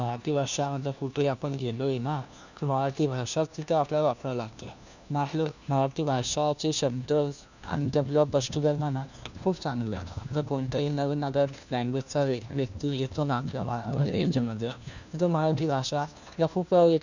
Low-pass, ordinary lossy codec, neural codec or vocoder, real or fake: 7.2 kHz; none; codec, 16 kHz, 1 kbps, FunCodec, trained on LibriTTS, 50 frames a second; fake